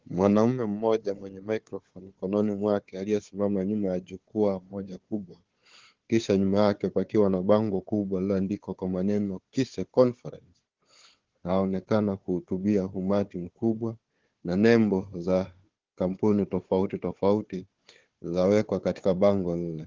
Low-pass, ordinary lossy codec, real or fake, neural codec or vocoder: 7.2 kHz; Opus, 16 kbps; fake; codec, 16 kHz, 4 kbps, FunCodec, trained on Chinese and English, 50 frames a second